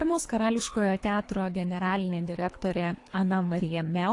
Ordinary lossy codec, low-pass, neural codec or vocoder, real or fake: AAC, 48 kbps; 10.8 kHz; codec, 24 kHz, 3 kbps, HILCodec; fake